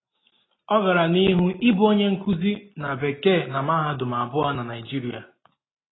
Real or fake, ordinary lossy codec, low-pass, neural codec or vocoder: real; AAC, 16 kbps; 7.2 kHz; none